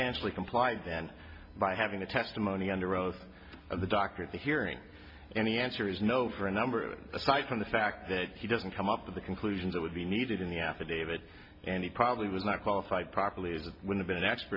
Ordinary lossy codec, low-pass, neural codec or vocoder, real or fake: AAC, 48 kbps; 5.4 kHz; none; real